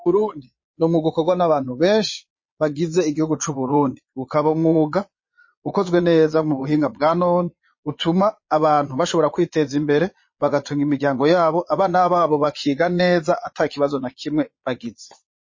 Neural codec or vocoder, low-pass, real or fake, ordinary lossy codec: vocoder, 22.05 kHz, 80 mel bands, Vocos; 7.2 kHz; fake; MP3, 32 kbps